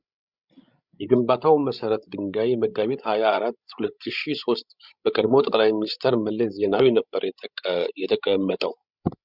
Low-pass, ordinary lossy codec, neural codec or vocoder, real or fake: 5.4 kHz; Opus, 64 kbps; codec, 16 kHz, 16 kbps, FreqCodec, larger model; fake